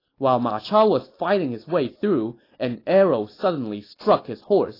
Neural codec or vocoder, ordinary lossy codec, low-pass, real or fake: none; AAC, 24 kbps; 5.4 kHz; real